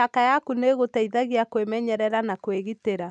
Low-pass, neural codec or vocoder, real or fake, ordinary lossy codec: none; none; real; none